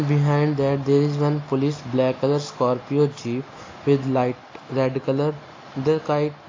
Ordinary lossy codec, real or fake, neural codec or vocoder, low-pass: AAC, 32 kbps; real; none; 7.2 kHz